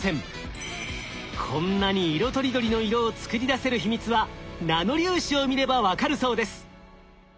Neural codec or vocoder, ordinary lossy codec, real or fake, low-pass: none; none; real; none